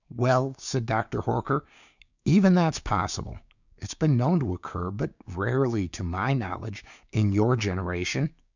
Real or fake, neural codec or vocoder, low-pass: fake; codec, 16 kHz, 6 kbps, DAC; 7.2 kHz